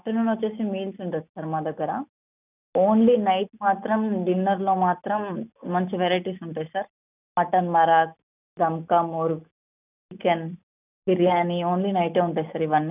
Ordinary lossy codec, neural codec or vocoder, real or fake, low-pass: none; none; real; 3.6 kHz